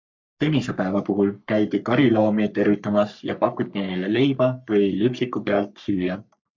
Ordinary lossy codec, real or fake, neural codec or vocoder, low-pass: MP3, 64 kbps; fake; codec, 44.1 kHz, 3.4 kbps, Pupu-Codec; 7.2 kHz